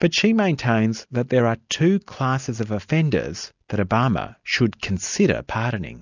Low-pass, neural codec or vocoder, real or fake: 7.2 kHz; none; real